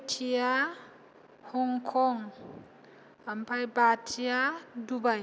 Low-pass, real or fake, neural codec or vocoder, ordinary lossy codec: none; real; none; none